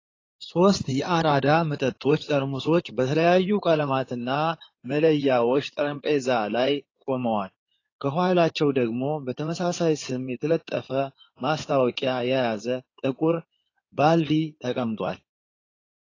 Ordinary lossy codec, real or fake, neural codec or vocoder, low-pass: AAC, 32 kbps; fake; codec, 16 kHz in and 24 kHz out, 2.2 kbps, FireRedTTS-2 codec; 7.2 kHz